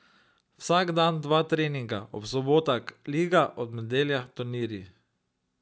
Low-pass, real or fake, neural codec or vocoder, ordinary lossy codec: none; real; none; none